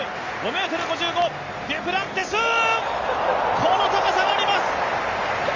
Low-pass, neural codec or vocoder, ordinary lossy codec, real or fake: 7.2 kHz; none; Opus, 32 kbps; real